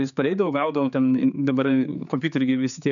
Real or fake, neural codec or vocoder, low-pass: fake; codec, 16 kHz, 4 kbps, X-Codec, HuBERT features, trained on general audio; 7.2 kHz